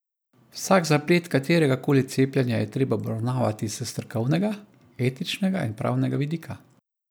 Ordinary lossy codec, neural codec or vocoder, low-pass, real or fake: none; none; none; real